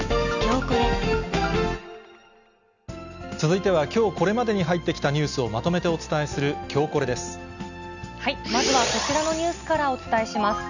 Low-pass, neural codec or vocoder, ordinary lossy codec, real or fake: 7.2 kHz; none; none; real